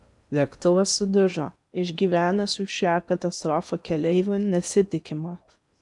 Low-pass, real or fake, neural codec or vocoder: 10.8 kHz; fake; codec, 16 kHz in and 24 kHz out, 0.8 kbps, FocalCodec, streaming, 65536 codes